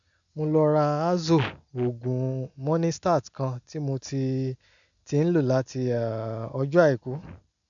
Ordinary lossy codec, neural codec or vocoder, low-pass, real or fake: none; none; 7.2 kHz; real